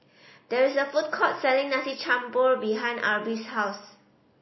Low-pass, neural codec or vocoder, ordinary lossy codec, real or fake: 7.2 kHz; none; MP3, 24 kbps; real